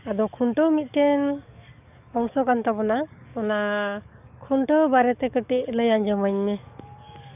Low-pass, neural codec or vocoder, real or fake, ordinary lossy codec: 3.6 kHz; codec, 44.1 kHz, 7.8 kbps, DAC; fake; none